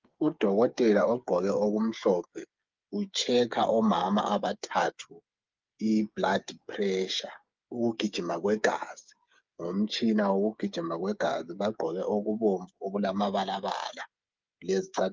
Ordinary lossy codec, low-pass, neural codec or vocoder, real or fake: Opus, 24 kbps; 7.2 kHz; codec, 16 kHz, 8 kbps, FreqCodec, smaller model; fake